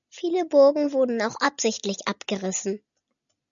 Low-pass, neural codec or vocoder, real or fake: 7.2 kHz; none; real